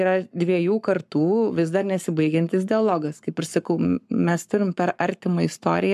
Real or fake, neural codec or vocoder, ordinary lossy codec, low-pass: fake; codec, 44.1 kHz, 7.8 kbps, Pupu-Codec; MP3, 96 kbps; 14.4 kHz